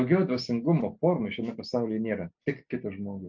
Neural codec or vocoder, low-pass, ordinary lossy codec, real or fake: none; 7.2 kHz; MP3, 48 kbps; real